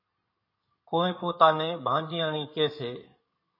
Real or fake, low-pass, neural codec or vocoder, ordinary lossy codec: fake; 5.4 kHz; codec, 16 kHz in and 24 kHz out, 2.2 kbps, FireRedTTS-2 codec; MP3, 24 kbps